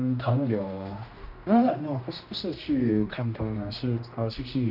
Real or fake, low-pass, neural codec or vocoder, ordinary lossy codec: fake; 5.4 kHz; codec, 16 kHz, 1 kbps, X-Codec, HuBERT features, trained on general audio; none